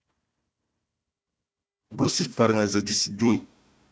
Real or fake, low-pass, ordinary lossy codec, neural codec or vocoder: fake; none; none; codec, 16 kHz, 1 kbps, FunCodec, trained on Chinese and English, 50 frames a second